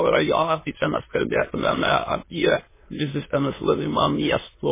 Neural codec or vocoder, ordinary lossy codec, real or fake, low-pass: autoencoder, 22.05 kHz, a latent of 192 numbers a frame, VITS, trained on many speakers; MP3, 16 kbps; fake; 3.6 kHz